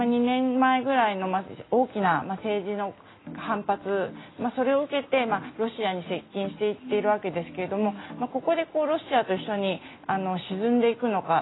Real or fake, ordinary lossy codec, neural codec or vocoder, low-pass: real; AAC, 16 kbps; none; 7.2 kHz